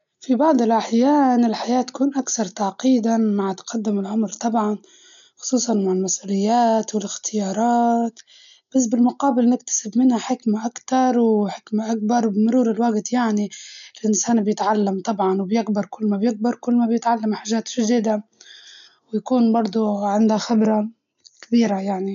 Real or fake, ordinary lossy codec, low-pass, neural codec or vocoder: real; none; 7.2 kHz; none